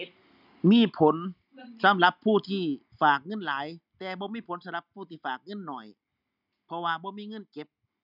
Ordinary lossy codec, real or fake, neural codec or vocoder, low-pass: none; real; none; 5.4 kHz